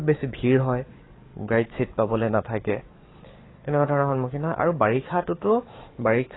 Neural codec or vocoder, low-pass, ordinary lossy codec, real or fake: codec, 44.1 kHz, 7.8 kbps, DAC; 7.2 kHz; AAC, 16 kbps; fake